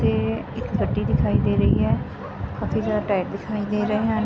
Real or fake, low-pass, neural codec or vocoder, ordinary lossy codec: real; none; none; none